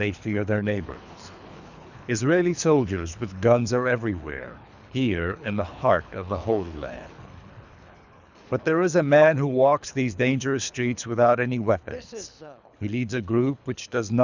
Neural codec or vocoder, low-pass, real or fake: codec, 24 kHz, 3 kbps, HILCodec; 7.2 kHz; fake